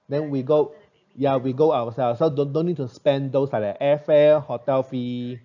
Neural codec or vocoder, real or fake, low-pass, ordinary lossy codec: none; real; 7.2 kHz; none